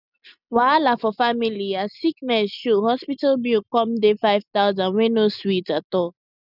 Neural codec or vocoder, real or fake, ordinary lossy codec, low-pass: none; real; none; 5.4 kHz